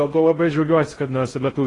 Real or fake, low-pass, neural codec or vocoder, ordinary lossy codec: fake; 10.8 kHz; codec, 16 kHz in and 24 kHz out, 0.6 kbps, FocalCodec, streaming, 4096 codes; AAC, 32 kbps